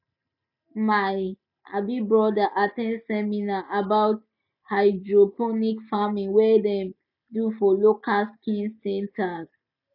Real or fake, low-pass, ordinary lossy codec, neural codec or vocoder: real; 5.4 kHz; none; none